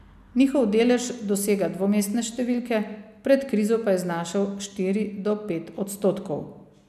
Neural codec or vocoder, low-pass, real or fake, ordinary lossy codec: none; 14.4 kHz; real; none